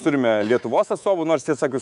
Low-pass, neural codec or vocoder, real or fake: 10.8 kHz; codec, 24 kHz, 3.1 kbps, DualCodec; fake